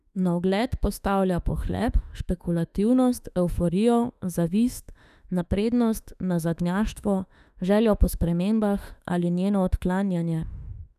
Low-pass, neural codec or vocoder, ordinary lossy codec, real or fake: 14.4 kHz; autoencoder, 48 kHz, 32 numbers a frame, DAC-VAE, trained on Japanese speech; none; fake